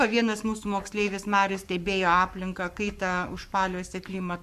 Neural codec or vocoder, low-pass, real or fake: codec, 44.1 kHz, 7.8 kbps, Pupu-Codec; 14.4 kHz; fake